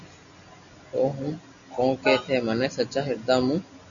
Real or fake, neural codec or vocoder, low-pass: real; none; 7.2 kHz